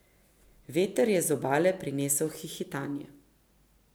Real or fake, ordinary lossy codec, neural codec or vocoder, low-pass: real; none; none; none